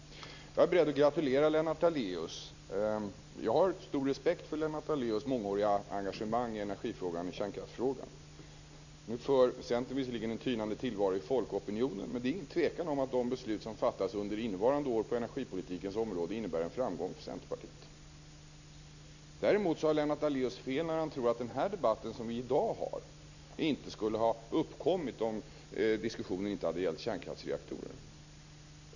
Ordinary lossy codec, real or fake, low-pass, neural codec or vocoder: none; real; 7.2 kHz; none